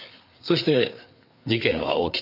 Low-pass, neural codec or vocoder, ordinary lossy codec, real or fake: 5.4 kHz; codec, 16 kHz, 4 kbps, FreqCodec, larger model; none; fake